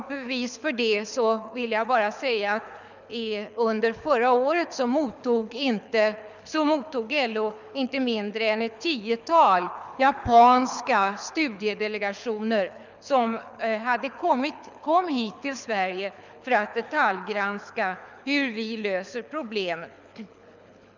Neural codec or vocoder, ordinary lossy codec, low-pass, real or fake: codec, 24 kHz, 6 kbps, HILCodec; none; 7.2 kHz; fake